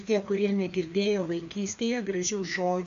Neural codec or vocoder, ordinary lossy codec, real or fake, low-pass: codec, 16 kHz, 2 kbps, FreqCodec, larger model; AAC, 96 kbps; fake; 7.2 kHz